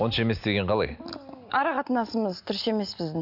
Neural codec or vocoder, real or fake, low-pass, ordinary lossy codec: none; real; 5.4 kHz; none